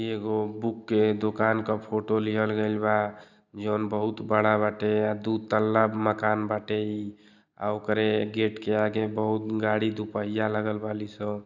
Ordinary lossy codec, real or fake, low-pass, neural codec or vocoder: none; real; 7.2 kHz; none